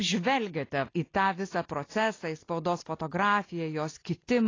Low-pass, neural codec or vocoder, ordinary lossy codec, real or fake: 7.2 kHz; none; AAC, 32 kbps; real